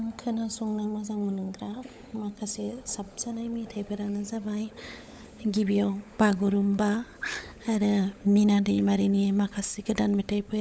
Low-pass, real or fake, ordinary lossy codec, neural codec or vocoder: none; fake; none; codec, 16 kHz, 16 kbps, FunCodec, trained on LibriTTS, 50 frames a second